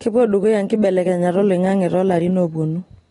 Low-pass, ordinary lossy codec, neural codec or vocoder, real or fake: 10.8 kHz; AAC, 32 kbps; none; real